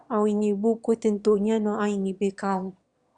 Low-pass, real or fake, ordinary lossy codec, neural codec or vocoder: 9.9 kHz; fake; Opus, 64 kbps; autoencoder, 22.05 kHz, a latent of 192 numbers a frame, VITS, trained on one speaker